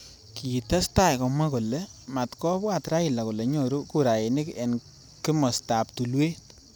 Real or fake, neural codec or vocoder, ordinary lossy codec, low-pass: real; none; none; none